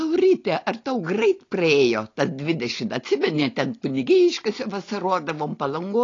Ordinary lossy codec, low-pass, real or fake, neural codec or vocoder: AAC, 32 kbps; 7.2 kHz; real; none